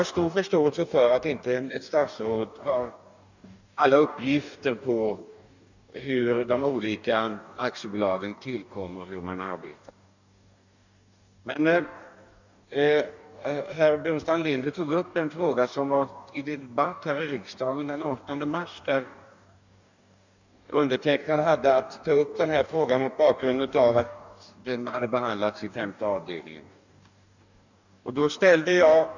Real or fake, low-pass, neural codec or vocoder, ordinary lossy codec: fake; 7.2 kHz; codec, 44.1 kHz, 2.6 kbps, DAC; none